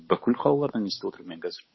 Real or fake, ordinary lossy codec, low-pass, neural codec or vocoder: fake; MP3, 24 kbps; 7.2 kHz; codec, 16 kHz, 8 kbps, FunCodec, trained on Chinese and English, 25 frames a second